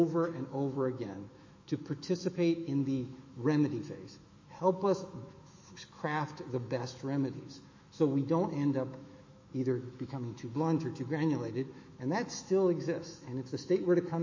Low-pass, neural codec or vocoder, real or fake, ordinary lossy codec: 7.2 kHz; autoencoder, 48 kHz, 128 numbers a frame, DAC-VAE, trained on Japanese speech; fake; MP3, 32 kbps